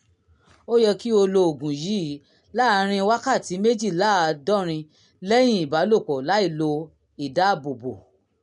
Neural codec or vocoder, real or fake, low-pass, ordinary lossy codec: none; real; 10.8 kHz; MP3, 64 kbps